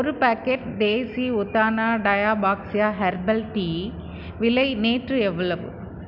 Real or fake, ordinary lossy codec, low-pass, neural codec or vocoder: real; none; 5.4 kHz; none